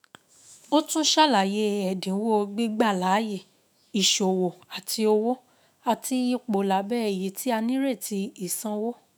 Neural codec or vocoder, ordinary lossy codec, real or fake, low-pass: autoencoder, 48 kHz, 128 numbers a frame, DAC-VAE, trained on Japanese speech; none; fake; none